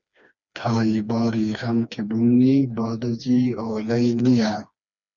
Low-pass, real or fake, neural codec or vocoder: 7.2 kHz; fake; codec, 16 kHz, 2 kbps, FreqCodec, smaller model